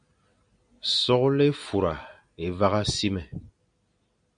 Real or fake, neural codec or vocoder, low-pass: real; none; 9.9 kHz